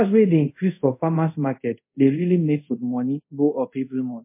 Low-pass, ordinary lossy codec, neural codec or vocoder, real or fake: 3.6 kHz; MP3, 24 kbps; codec, 24 kHz, 0.5 kbps, DualCodec; fake